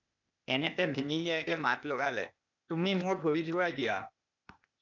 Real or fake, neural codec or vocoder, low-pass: fake; codec, 16 kHz, 0.8 kbps, ZipCodec; 7.2 kHz